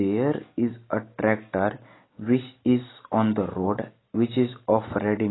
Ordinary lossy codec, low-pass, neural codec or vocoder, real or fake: AAC, 16 kbps; 7.2 kHz; none; real